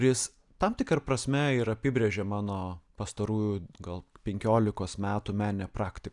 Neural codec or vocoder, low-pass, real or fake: none; 10.8 kHz; real